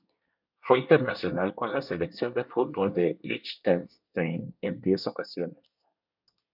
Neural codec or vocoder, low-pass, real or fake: codec, 24 kHz, 1 kbps, SNAC; 5.4 kHz; fake